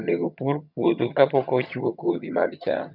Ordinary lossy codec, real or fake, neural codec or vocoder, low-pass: none; fake; vocoder, 22.05 kHz, 80 mel bands, HiFi-GAN; 5.4 kHz